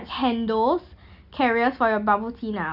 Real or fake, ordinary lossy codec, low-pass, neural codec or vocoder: real; none; 5.4 kHz; none